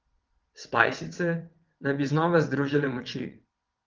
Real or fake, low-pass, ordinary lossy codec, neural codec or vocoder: fake; 7.2 kHz; Opus, 16 kbps; vocoder, 22.05 kHz, 80 mel bands, WaveNeXt